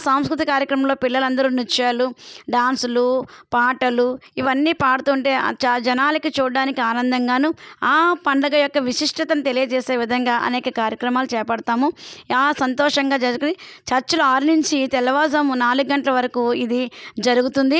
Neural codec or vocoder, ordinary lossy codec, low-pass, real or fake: none; none; none; real